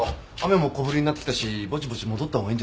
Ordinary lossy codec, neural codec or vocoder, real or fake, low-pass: none; none; real; none